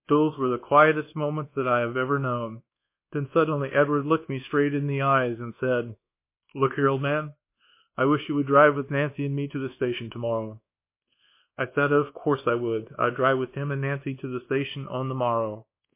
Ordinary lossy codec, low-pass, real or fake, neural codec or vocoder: MP3, 24 kbps; 3.6 kHz; fake; codec, 24 kHz, 1.2 kbps, DualCodec